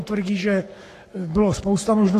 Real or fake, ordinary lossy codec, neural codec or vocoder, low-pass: fake; AAC, 48 kbps; codec, 44.1 kHz, 7.8 kbps, Pupu-Codec; 14.4 kHz